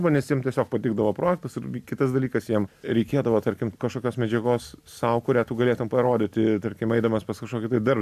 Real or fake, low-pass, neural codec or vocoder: real; 14.4 kHz; none